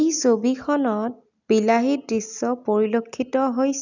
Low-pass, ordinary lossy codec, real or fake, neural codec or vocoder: 7.2 kHz; none; real; none